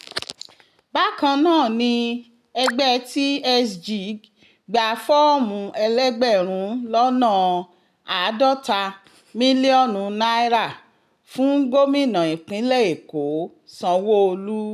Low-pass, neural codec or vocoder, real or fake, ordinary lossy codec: 14.4 kHz; none; real; none